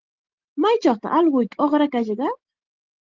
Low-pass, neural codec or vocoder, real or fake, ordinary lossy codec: 7.2 kHz; none; real; Opus, 16 kbps